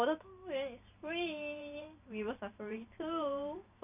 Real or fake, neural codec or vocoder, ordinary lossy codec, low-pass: fake; vocoder, 44.1 kHz, 128 mel bands every 512 samples, BigVGAN v2; none; 3.6 kHz